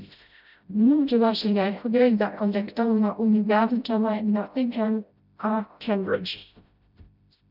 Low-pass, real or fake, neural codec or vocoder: 5.4 kHz; fake; codec, 16 kHz, 0.5 kbps, FreqCodec, smaller model